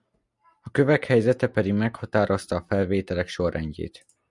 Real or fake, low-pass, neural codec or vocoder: real; 10.8 kHz; none